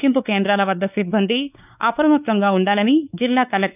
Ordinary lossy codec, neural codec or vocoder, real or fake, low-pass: none; codec, 16 kHz, 4 kbps, X-Codec, WavLM features, trained on Multilingual LibriSpeech; fake; 3.6 kHz